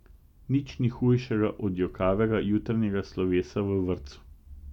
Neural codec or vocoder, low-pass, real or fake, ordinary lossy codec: none; 19.8 kHz; real; none